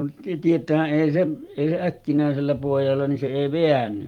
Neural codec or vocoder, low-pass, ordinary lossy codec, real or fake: vocoder, 44.1 kHz, 128 mel bands every 256 samples, BigVGAN v2; 19.8 kHz; Opus, 32 kbps; fake